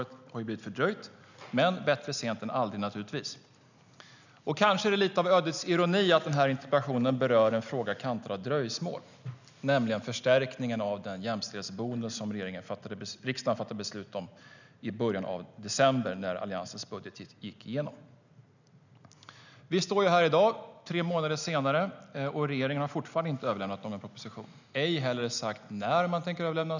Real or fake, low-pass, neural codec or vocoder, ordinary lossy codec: real; 7.2 kHz; none; none